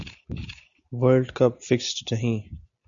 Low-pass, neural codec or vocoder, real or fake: 7.2 kHz; none; real